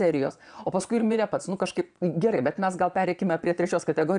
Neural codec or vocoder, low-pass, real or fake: vocoder, 22.05 kHz, 80 mel bands, Vocos; 9.9 kHz; fake